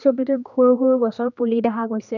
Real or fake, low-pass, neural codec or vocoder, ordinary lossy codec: fake; 7.2 kHz; codec, 16 kHz, 2 kbps, X-Codec, HuBERT features, trained on general audio; none